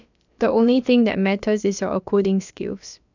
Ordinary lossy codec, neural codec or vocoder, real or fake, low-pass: none; codec, 16 kHz, about 1 kbps, DyCAST, with the encoder's durations; fake; 7.2 kHz